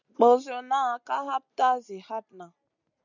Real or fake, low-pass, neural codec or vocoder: real; 7.2 kHz; none